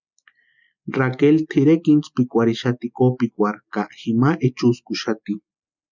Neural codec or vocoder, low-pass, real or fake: none; 7.2 kHz; real